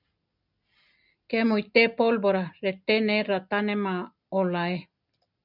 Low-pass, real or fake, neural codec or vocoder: 5.4 kHz; real; none